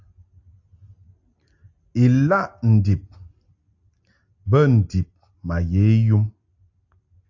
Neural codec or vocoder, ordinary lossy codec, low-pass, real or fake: none; AAC, 48 kbps; 7.2 kHz; real